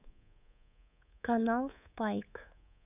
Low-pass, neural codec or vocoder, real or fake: 3.6 kHz; codec, 24 kHz, 3.1 kbps, DualCodec; fake